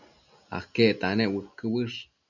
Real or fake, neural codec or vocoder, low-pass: real; none; 7.2 kHz